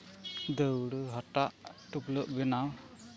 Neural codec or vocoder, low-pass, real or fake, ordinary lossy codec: none; none; real; none